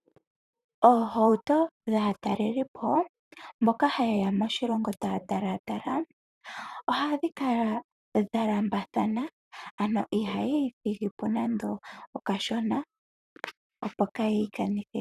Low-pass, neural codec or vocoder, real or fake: 14.4 kHz; vocoder, 44.1 kHz, 128 mel bands, Pupu-Vocoder; fake